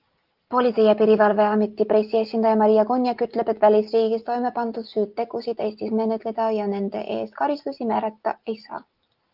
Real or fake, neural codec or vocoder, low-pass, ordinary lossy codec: real; none; 5.4 kHz; Opus, 32 kbps